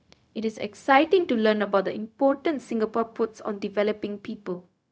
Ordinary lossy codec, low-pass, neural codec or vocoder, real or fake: none; none; codec, 16 kHz, 0.4 kbps, LongCat-Audio-Codec; fake